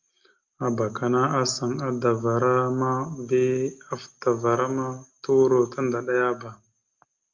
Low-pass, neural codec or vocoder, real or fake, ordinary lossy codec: 7.2 kHz; none; real; Opus, 24 kbps